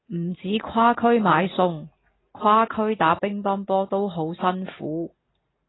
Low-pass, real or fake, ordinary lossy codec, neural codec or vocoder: 7.2 kHz; real; AAC, 16 kbps; none